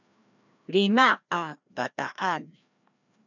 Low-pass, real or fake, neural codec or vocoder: 7.2 kHz; fake; codec, 16 kHz, 1 kbps, FreqCodec, larger model